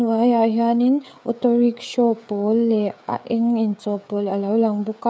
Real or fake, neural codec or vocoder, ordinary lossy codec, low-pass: fake; codec, 16 kHz, 16 kbps, FreqCodec, smaller model; none; none